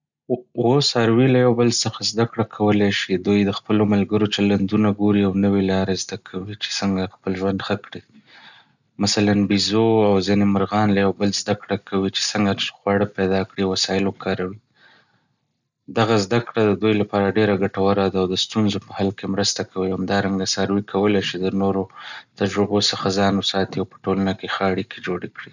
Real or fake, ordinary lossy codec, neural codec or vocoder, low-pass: real; none; none; 7.2 kHz